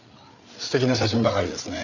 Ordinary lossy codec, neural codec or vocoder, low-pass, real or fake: none; codec, 16 kHz, 8 kbps, FreqCodec, larger model; 7.2 kHz; fake